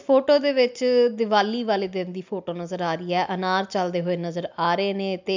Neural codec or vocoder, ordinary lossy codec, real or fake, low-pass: none; MP3, 64 kbps; real; 7.2 kHz